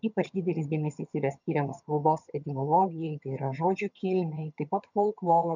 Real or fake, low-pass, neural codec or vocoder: fake; 7.2 kHz; vocoder, 22.05 kHz, 80 mel bands, HiFi-GAN